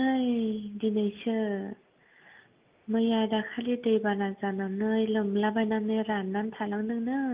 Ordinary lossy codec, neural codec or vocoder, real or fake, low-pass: Opus, 24 kbps; none; real; 3.6 kHz